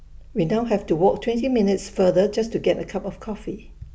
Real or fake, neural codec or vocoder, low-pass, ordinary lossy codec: real; none; none; none